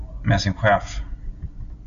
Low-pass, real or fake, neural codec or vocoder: 7.2 kHz; real; none